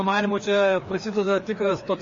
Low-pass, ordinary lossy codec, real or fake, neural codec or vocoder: 7.2 kHz; MP3, 32 kbps; fake; codec, 16 kHz, 2 kbps, FreqCodec, larger model